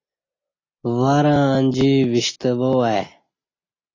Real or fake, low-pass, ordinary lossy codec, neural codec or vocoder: real; 7.2 kHz; AAC, 32 kbps; none